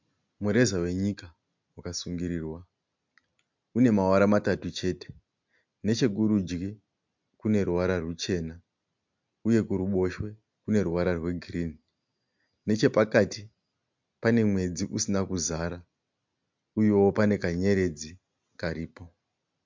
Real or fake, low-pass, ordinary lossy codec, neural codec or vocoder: real; 7.2 kHz; MP3, 64 kbps; none